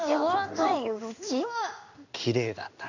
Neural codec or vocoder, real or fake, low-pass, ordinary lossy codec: codec, 16 kHz in and 24 kHz out, 1 kbps, XY-Tokenizer; fake; 7.2 kHz; none